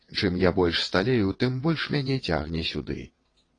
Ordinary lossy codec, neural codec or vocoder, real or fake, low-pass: AAC, 32 kbps; vocoder, 22.05 kHz, 80 mel bands, WaveNeXt; fake; 9.9 kHz